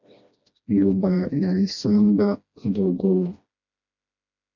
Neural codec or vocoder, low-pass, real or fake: codec, 16 kHz, 1 kbps, FreqCodec, smaller model; 7.2 kHz; fake